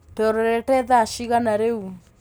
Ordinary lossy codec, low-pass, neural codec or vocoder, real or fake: none; none; none; real